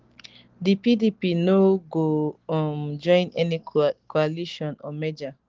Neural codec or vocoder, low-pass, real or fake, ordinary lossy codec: none; 7.2 kHz; real; Opus, 16 kbps